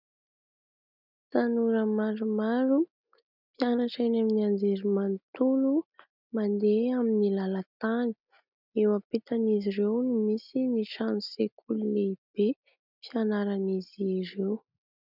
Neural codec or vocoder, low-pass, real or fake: none; 5.4 kHz; real